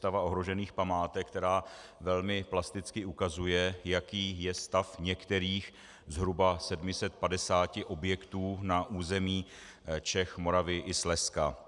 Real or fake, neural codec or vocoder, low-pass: real; none; 10.8 kHz